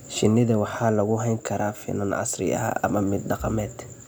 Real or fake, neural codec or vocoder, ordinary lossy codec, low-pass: real; none; none; none